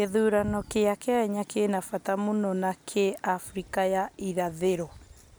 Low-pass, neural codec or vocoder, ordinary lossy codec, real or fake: none; none; none; real